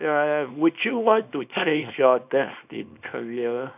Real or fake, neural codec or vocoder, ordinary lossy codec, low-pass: fake; codec, 24 kHz, 0.9 kbps, WavTokenizer, small release; none; 3.6 kHz